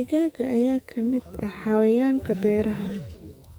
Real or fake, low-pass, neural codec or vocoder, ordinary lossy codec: fake; none; codec, 44.1 kHz, 2.6 kbps, SNAC; none